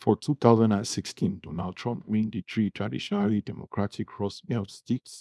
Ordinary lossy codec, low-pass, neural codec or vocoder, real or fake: none; none; codec, 24 kHz, 0.9 kbps, WavTokenizer, small release; fake